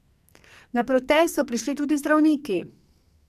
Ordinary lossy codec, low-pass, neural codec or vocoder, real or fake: MP3, 96 kbps; 14.4 kHz; codec, 44.1 kHz, 2.6 kbps, SNAC; fake